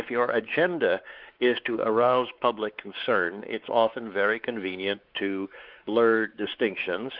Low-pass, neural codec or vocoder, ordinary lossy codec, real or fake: 5.4 kHz; codec, 16 kHz, 4 kbps, X-Codec, WavLM features, trained on Multilingual LibriSpeech; Opus, 24 kbps; fake